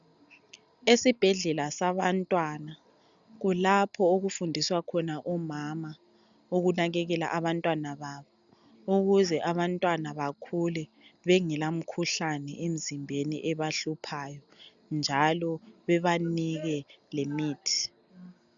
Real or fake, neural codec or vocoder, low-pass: real; none; 7.2 kHz